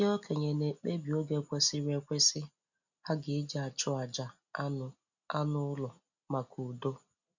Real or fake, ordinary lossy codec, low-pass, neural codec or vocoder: real; none; 7.2 kHz; none